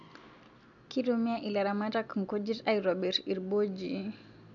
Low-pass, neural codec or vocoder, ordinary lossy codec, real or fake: 7.2 kHz; none; none; real